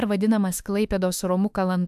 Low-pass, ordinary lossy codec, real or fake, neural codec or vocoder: 14.4 kHz; AAC, 96 kbps; fake; autoencoder, 48 kHz, 32 numbers a frame, DAC-VAE, trained on Japanese speech